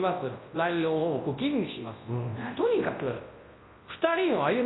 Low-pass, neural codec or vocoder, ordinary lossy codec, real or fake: 7.2 kHz; codec, 24 kHz, 0.9 kbps, WavTokenizer, large speech release; AAC, 16 kbps; fake